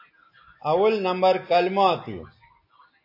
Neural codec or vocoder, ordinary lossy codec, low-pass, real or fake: none; AAC, 32 kbps; 5.4 kHz; real